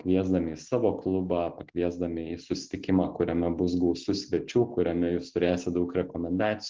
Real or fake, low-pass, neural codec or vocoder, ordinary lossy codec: real; 7.2 kHz; none; Opus, 16 kbps